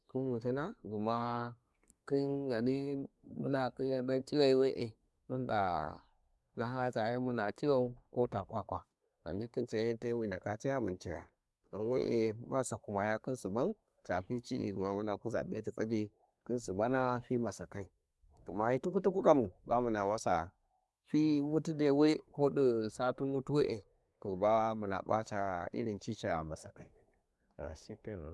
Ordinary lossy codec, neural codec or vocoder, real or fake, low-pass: none; codec, 24 kHz, 1 kbps, SNAC; fake; none